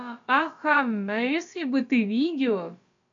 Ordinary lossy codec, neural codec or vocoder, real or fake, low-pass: AAC, 64 kbps; codec, 16 kHz, about 1 kbps, DyCAST, with the encoder's durations; fake; 7.2 kHz